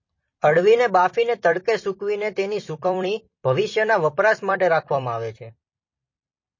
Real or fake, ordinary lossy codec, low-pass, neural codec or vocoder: fake; MP3, 32 kbps; 7.2 kHz; vocoder, 44.1 kHz, 128 mel bands every 256 samples, BigVGAN v2